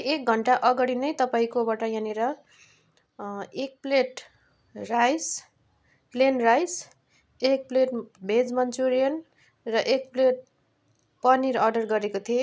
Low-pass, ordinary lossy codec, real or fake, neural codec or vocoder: none; none; real; none